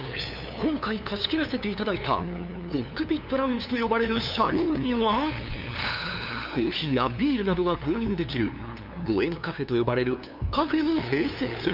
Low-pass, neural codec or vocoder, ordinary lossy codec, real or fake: 5.4 kHz; codec, 16 kHz, 2 kbps, FunCodec, trained on LibriTTS, 25 frames a second; none; fake